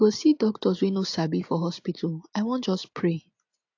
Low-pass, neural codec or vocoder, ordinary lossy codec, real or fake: 7.2 kHz; none; AAC, 48 kbps; real